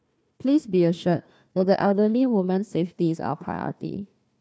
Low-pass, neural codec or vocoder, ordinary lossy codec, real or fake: none; codec, 16 kHz, 1 kbps, FunCodec, trained on Chinese and English, 50 frames a second; none; fake